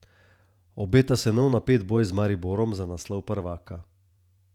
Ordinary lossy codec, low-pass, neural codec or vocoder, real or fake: none; 19.8 kHz; vocoder, 48 kHz, 128 mel bands, Vocos; fake